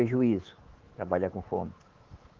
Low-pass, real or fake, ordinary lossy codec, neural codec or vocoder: 7.2 kHz; fake; Opus, 16 kbps; codec, 24 kHz, 3.1 kbps, DualCodec